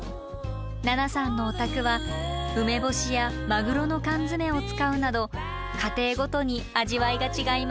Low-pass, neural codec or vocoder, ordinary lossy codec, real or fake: none; none; none; real